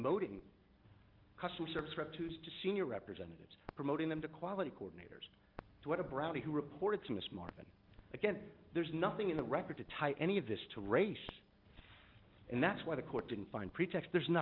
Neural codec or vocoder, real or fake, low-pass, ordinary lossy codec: none; real; 5.4 kHz; Opus, 24 kbps